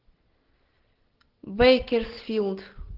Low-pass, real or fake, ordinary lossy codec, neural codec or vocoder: 5.4 kHz; real; Opus, 16 kbps; none